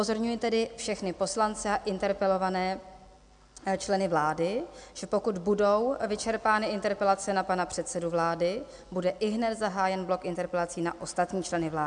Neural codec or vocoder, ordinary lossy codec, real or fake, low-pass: none; MP3, 96 kbps; real; 9.9 kHz